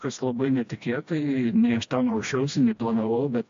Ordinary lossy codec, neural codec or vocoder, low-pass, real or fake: AAC, 64 kbps; codec, 16 kHz, 1 kbps, FreqCodec, smaller model; 7.2 kHz; fake